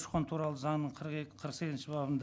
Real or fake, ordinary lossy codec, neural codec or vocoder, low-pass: real; none; none; none